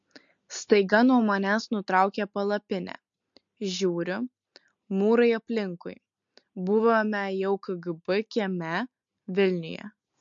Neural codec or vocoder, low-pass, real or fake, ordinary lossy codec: none; 7.2 kHz; real; MP3, 48 kbps